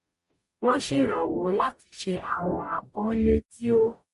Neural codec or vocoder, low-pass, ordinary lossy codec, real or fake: codec, 44.1 kHz, 0.9 kbps, DAC; 14.4 kHz; MP3, 48 kbps; fake